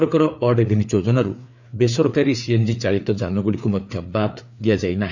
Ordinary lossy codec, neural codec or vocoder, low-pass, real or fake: none; codec, 16 kHz, 4 kbps, FreqCodec, larger model; 7.2 kHz; fake